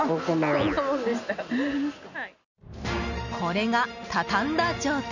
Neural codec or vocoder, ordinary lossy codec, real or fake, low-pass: none; none; real; 7.2 kHz